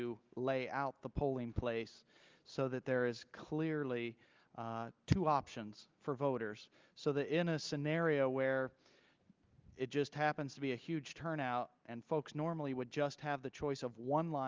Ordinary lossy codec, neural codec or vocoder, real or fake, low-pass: Opus, 24 kbps; none; real; 7.2 kHz